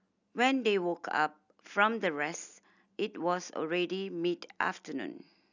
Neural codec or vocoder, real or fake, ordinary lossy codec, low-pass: none; real; none; 7.2 kHz